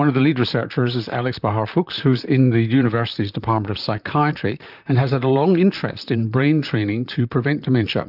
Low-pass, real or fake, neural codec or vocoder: 5.4 kHz; fake; vocoder, 44.1 kHz, 128 mel bands, Pupu-Vocoder